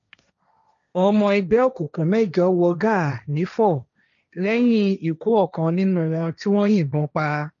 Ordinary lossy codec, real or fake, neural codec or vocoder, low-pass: MP3, 96 kbps; fake; codec, 16 kHz, 1.1 kbps, Voila-Tokenizer; 7.2 kHz